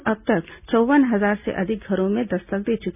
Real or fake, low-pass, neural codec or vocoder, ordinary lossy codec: real; 3.6 kHz; none; none